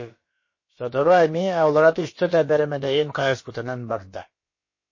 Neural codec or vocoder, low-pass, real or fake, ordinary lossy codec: codec, 16 kHz, about 1 kbps, DyCAST, with the encoder's durations; 7.2 kHz; fake; MP3, 32 kbps